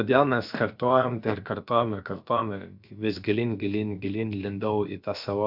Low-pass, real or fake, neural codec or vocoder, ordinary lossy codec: 5.4 kHz; fake; codec, 16 kHz, about 1 kbps, DyCAST, with the encoder's durations; Opus, 64 kbps